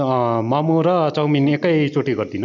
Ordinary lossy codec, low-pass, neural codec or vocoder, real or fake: none; 7.2 kHz; none; real